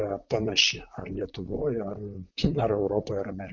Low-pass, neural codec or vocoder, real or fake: 7.2 kHz; vocoder, 44.1 kHz, 128 mel bands, Pupu-Vocoder; fake